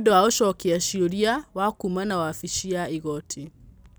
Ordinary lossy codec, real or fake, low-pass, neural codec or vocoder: none; real; none; none